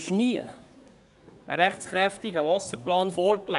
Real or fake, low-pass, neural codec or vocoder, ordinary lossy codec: fake; 10.8 kHz; codec, 24 kHz, 1 kbps, SNAC; none